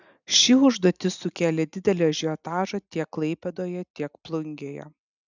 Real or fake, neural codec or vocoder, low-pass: real; none; 7.2 kHz